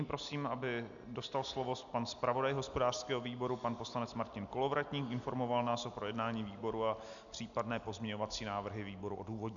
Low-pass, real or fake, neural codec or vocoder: 7.2 kHz; real; none